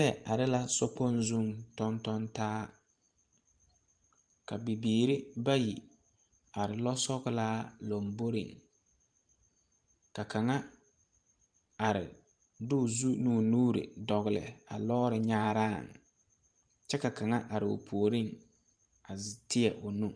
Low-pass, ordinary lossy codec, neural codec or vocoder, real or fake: 9.9 kHz; Opus, 32 kbps; vocoder, 44.1 kHz, 128 mel bands every 512 samples, BigVGAN v2; fake